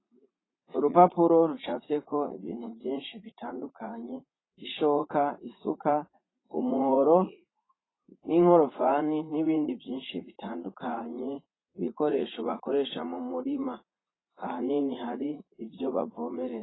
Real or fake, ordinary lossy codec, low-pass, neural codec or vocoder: fake; AAC, 16 kbps; 7.2 kHz; vocoder, 44.1 kHz, 80 mel bands, Vocos